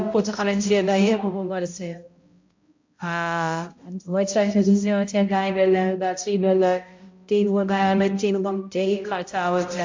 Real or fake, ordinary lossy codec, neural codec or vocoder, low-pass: fake; MP3, 48 kbps; codec, 16 kHz, 0.5 kbps, X-Codec, HuBERT features, trained on balanced general audio; 7.2 kHz